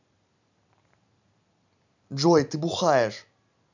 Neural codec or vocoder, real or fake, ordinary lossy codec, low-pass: none; real; none; 7.2 kHz